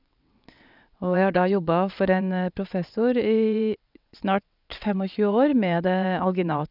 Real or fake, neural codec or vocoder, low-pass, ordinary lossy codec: fake; vocoder, 22.05 kHz, 80 mel bands, WaveNeXt; 5.4 kHz; none